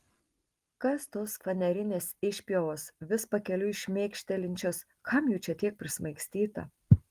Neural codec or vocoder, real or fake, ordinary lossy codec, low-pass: none; real; Opus, 24 kbps; 14.4 kHz